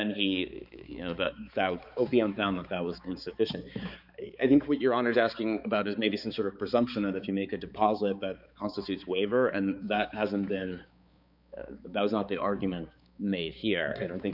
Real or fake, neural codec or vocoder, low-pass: fake; codec, 16 kHz, 4 kbps, X-Codec, HuBERT features, trained on balanced general audio; 5.4 kHz